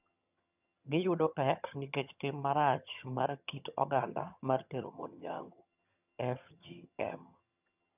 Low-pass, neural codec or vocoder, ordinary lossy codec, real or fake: 3.6 kHz; vocoder, 22.05 kHz, 80 mel bands, HiFi-GAN; none; fake